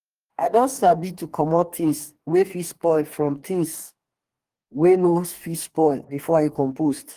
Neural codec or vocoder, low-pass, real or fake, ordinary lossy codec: codec, 44.1 kHz, 2.6 kbps, DAC; 14.4 kHz; fake; Opus, 24 kbps